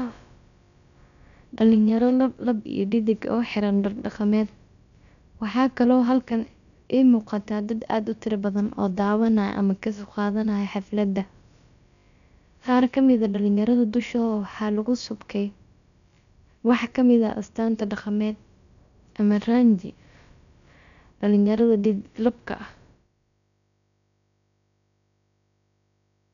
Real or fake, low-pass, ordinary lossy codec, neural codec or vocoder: fake; 7.2 kHz; none; codec, 16 kHz, about 1 kbps, DyCAST, with the encoder's durations